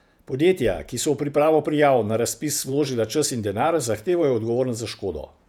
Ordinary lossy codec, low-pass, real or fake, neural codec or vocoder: none; 19.8 kHz; fake; vocoder, 48 kHz, 128 mel bands, Vocos